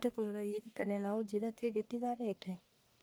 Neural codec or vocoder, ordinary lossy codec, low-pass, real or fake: codec, 44.1 kHz, 1.7 kbps, Pupu-Codec; none; none; fake